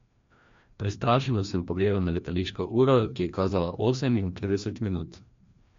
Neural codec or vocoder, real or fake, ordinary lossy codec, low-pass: codec, 16 kHz, 1 kbps, FreqCodec, larger model; fake; MP3, 48 kbps; 7.2 kHz